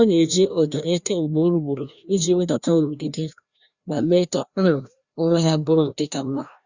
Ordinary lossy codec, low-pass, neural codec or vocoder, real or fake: Opus, 64 kbps; 7.2 kHz; codec, 16 kHz, 1 kbps, FreqCodec, larger model; fake